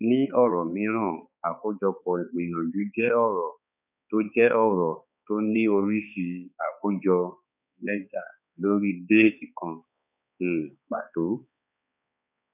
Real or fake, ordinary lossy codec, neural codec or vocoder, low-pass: fake; none; codec, 16 kHz, 4 kbps, X-Codec, HuBERT features, trained on balanced general audio; 3.6 kHz